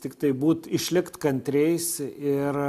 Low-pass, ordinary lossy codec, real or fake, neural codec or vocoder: 14.4 kHz; MP3, 96 kbps; real; none